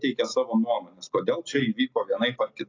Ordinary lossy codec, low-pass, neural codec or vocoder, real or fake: AAC, 32 kbps; 7.2 kHz; none; real